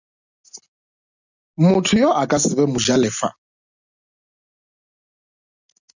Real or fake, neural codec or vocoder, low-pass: real; none; 7.2 kHz